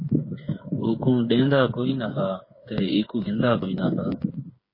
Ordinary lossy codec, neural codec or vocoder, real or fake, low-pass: MP3, 24 kbps; codec, 16 kHz, 8 kbps, FreqCodec, smaller model; fake; 5.4 kHz